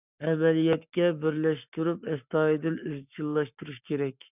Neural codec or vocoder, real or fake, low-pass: codec, 44.1 kHz, 7.8 kbps, Pupu-Codec; fake; 3.6 kHz